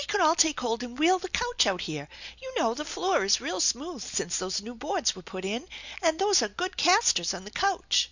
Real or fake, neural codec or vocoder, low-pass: real; none; 7.2 kHz